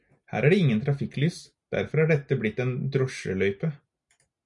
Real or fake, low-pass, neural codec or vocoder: real; 10.8 kHz; none